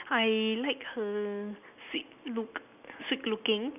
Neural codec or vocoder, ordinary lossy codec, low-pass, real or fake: none; none; 3.6 kHz; real